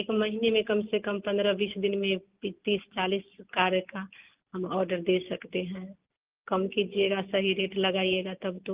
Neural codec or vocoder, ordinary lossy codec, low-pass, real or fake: none; Opus, 32 kbps; 3.6 kHz; real